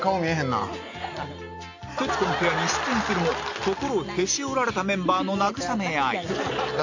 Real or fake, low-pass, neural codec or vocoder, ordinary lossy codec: real; 7.2 kHz; none; AAC, 48 kbps